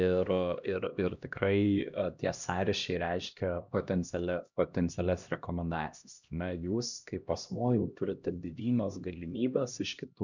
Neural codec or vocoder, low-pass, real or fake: codec, 16 kHz, 1 kbps, X-Codec, HuBERT features, trained on LibriSpeech; 7.2 kHz; fake